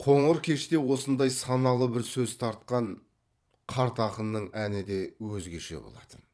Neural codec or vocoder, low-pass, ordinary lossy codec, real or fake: vocoder, 22.05 kHz, 80 mel bands, Vocos; none; none; fake